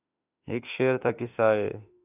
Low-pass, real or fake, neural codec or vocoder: 3.6 kHz; fake; autoencoder, 48 kHz, 32 numbers a frame, DAC-VAE, trained on Japanese speech